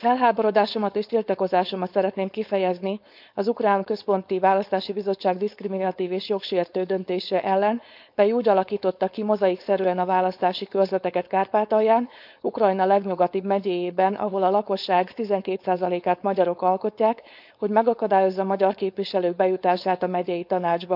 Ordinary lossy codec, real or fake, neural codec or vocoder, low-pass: none; fake; codec, 16 kHz, 4.8 kbps, FACodec; 5.4 kHz